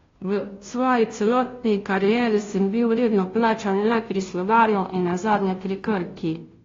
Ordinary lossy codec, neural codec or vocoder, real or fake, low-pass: AAC, 32 kbps; codec, 16 kHz, 0.5 kbps, FunCodec, trained on Chinese and English, 25 frames a second; fake; 7.2 kHz